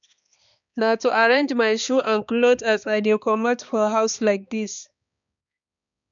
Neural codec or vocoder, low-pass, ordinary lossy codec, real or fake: codec, 16 kHz, 2 kbps, X-Codec, HuBERT features, trained on balanced general audio; 7.2 kHz; none; fake